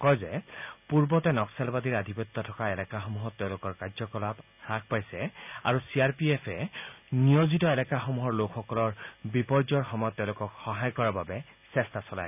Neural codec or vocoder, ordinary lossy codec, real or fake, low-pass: none; none; real; 3.6 kHz